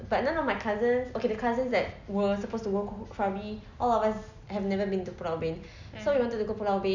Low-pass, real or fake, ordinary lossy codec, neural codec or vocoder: 7.2 kHz; real; none; none